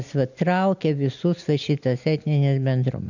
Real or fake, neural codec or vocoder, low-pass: real; none; 7.2 kHz